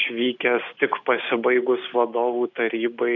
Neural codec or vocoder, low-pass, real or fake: none; 7.2 kHz; real